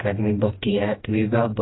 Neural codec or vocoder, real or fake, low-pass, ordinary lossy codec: codec, 16 kHz, 1 kbps, FreqCodec, smaller model; fake; 7.2 kHz; AAC, 16 kbps